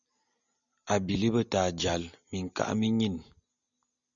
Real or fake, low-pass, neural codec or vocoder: real; 7.2 kHz; none